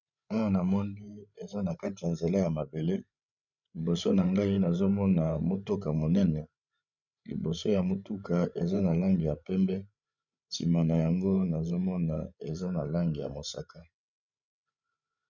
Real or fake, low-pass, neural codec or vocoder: fake; 7.2 kHz; codec, 16 kHz, 16 kbps, FreqCodec, larger model